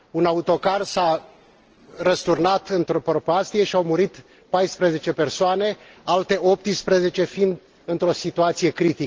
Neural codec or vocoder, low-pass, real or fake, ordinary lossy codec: none; 7.2 kHz; real; Opus, 16 kbps